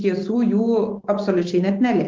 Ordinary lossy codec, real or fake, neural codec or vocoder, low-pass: Opus, 16 kbps; real; none; 7.2 kHz